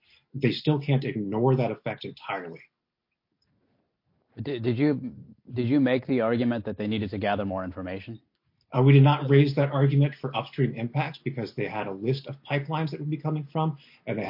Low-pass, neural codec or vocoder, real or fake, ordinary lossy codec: 5.4 kHz; none; real; MP3, 32 kbps